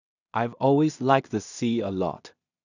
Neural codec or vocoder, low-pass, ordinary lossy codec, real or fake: codec, 16 kHz in and 24 kHz out, 0.4 kbps, LongCat-Audio-Codec, two codebook decoder; 7.2 kHz; none; fake